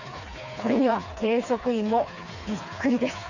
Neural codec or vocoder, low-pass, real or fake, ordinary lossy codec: codec, 16 kHz, 4 kbps, FreqCodec, smaller model; 7.2 kHz; fake; none